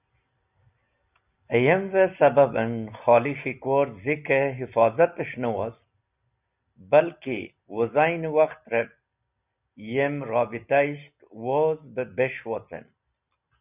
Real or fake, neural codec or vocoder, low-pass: real; none; 3.6 kHz